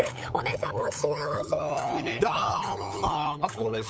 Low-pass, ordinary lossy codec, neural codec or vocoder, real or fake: none; none; codec, 16 kHz, 8 kbps, FunCodec, trained on LibriTTS, 25 frames a second; fake